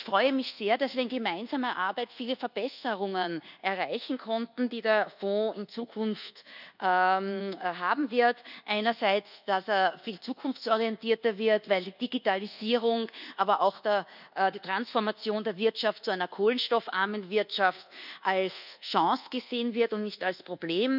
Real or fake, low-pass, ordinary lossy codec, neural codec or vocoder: fake; 5.4 kHz; none; codec, 24 kHz, 1.2 kbps, DualCodec